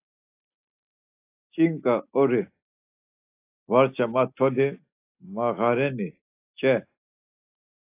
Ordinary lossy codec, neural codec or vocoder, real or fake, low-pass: AAC, 24 kbps; none; real; 3.6 kHz